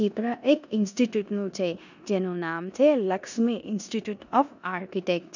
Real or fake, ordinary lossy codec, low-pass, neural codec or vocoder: fake; none; 7.2 kHz; codec, 16 kHz in and 24 kHz out, 0.9 kbps, LongCat-Audio-Codec, four codebook decoder